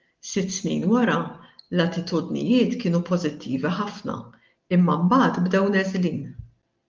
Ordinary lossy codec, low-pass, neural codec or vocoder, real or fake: Opus, 32 kbps; 7.2 kHz; none; real